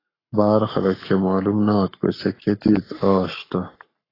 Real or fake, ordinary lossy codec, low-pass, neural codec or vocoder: fake; AAC, 24 kbps; 5.4 kHz; codec, 44.1 kHz, 7.8 kbps, Pupu-Codec